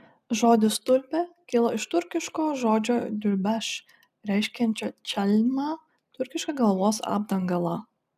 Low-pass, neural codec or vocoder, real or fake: 14.4 kHz; none; real